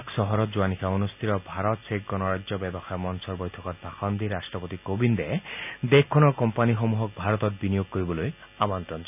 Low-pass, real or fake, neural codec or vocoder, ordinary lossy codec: 3.6 kHz; real; none; none